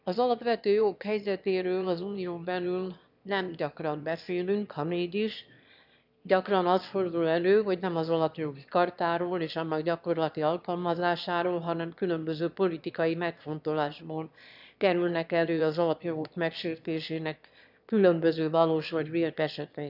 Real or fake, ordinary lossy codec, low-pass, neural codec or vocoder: fake; none; 5.4 kHz; autoencoder, 22.05 kHz, a latent of 192 numbers a frame, VITS, trained on one speaker